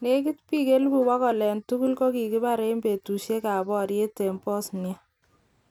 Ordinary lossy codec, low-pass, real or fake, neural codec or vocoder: none; 19.8 kHz; real; none